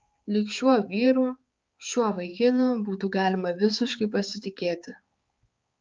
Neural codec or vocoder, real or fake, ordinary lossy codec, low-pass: codec, 16 kHz, 4 kbps, X-Codec, HuBERT features, trained on balanced general audio; fake; Opus, 32 kbps; 7.2 kHz